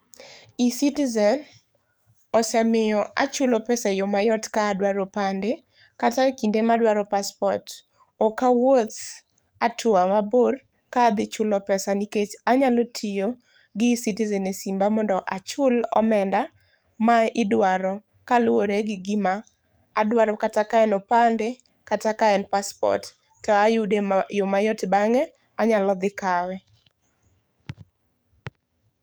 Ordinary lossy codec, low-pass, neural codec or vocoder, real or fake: none; none; codec, 44.1 kHz, 7.8 kbps, DAC; fake